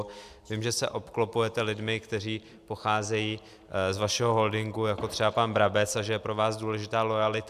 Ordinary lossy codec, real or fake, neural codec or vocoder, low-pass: AAC, 96 kbps; real; none; 14.4 kHz